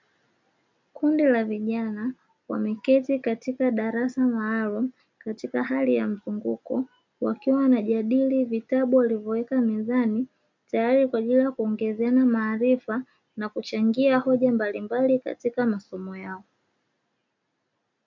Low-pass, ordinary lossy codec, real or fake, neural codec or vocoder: 7.2 kHz; MP3, 64 kbps; real; none